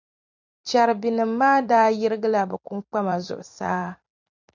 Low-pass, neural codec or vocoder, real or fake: 7.2 kHz; vocoder, 44.1 kHz, 128 mel bands every 256 samples, BigVGAN v2; fake